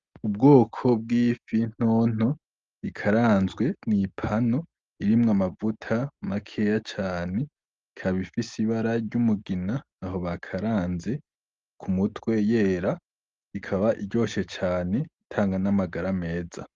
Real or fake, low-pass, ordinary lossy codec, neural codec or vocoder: real; 7.2 kHz; Opus, 32 kbps; none